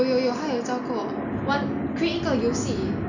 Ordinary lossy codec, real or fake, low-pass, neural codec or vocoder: none; real; 7.2 kHz; none